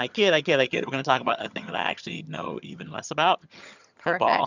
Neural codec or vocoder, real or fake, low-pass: vocoder, 22.05 kHz, 80 mel bands, HiFi-GAN; fake; 7.2 kHz